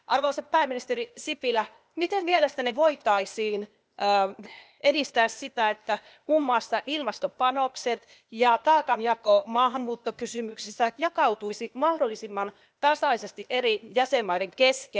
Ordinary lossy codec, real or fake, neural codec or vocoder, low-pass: none; fake; codec, 16 kHz, 0.8 kbps, ZipCodec; none